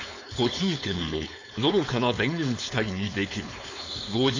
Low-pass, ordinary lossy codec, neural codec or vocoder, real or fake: 7.2 kHz; AAC, 48 kbps; codec, 16 kHz, 4.8 kbps, FACodec; fake